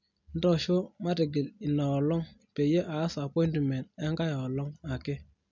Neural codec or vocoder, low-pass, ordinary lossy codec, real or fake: none; 7.2 kHz; none; real